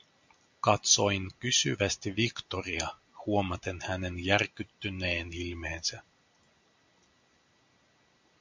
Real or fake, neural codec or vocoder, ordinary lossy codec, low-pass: real; none; MP3, 64 kbps; 7.2 kHz